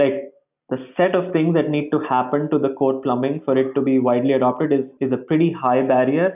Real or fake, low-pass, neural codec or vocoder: real; 3.6 kHz; none